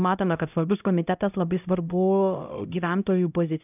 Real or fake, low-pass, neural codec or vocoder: fake; 3.6 kHz; codec, 16 kHz, 0.5 kbps, X-Codec, HuBERT features, trained on LibriSpeech